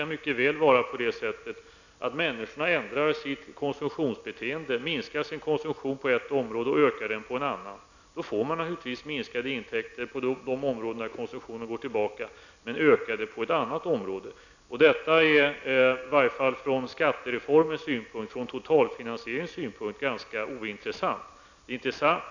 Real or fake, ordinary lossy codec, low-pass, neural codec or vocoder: real; none; 7.2 kHz; none